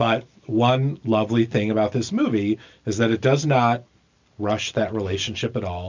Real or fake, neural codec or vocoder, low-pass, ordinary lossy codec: real; none; 7.2 kHz; MP3, 64 kbps